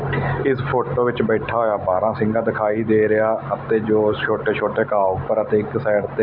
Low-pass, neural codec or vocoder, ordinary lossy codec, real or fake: 5.4 kHz; none; none; real